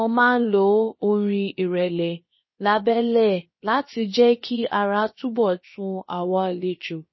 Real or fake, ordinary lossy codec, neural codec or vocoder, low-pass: fake; MP3, 24 kbps; codec, 16 kHz, about 1 kbps, DyCAST, with the encoder's durations; 7.2 kHz